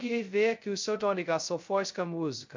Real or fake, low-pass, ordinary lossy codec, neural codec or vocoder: fake; 7.2 kHz; MP3, 48 kbps; codec, 16 kHz, 0.2 kbps, FocalCodec